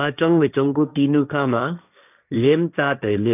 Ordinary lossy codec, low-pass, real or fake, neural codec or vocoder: none; 3.6 kHz; fake; codec, 16 kHz, 1.1 kbps, Voila-Tokenizer